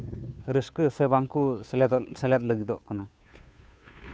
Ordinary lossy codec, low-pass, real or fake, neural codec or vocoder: none; none; fake; codec, 16 kHz, 2 kbps, FunCodec, trained on Chinese and English, 25 frames a second